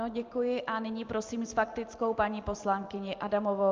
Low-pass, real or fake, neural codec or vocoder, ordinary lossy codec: 7.2 kHz; real; none; Opus, 24 kbps